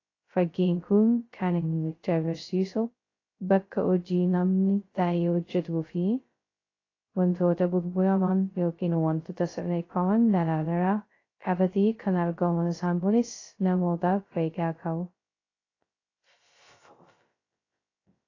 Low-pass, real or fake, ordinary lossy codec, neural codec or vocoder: 7.2 kHz; fake; AAC, 32 kbps; codec, 16 kHz, 0.2 kbps, FocalCodec